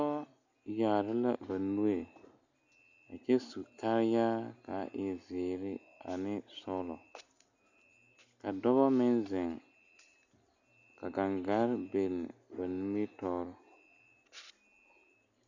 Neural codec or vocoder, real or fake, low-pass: none; real; 7.2 kHz